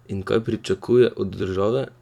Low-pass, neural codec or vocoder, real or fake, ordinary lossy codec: 19.8 kHz; vocoder, 44.1 kHz, 128 mel bands every 256 samples, BigVGAN v2; fake; none